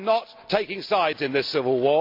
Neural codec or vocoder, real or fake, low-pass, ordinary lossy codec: none; real; 5.4 kHz; MP3, 48 kbps